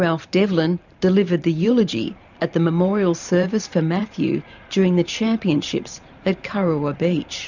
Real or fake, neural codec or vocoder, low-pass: fake; vocoder, 44.1 kHz, 128 mel bands every 512 samples, BigVGAN v2; 7.2 kHz